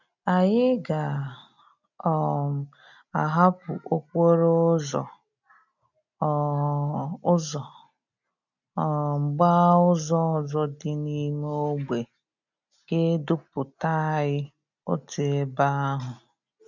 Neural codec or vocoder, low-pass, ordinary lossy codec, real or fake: none; 7.2 kHz; none; real